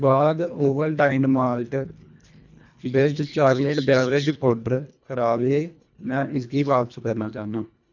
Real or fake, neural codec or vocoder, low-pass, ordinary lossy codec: fake; codec, 24 kHz, 1.5 kbps, HILCodec; 7.2 kHz; none